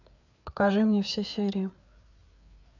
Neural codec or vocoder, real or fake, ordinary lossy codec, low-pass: codec, 16 kHz, 4 kbps, FreqCodec, larger model; fake; none; 7.2 kHz